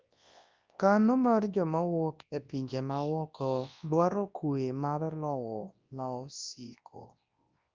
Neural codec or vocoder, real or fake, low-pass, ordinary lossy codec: codec, 24 kHz, 0.9 kbps, WavTokenizer, large speech release; fake; 7.2 kHz; Opus, 24 kbps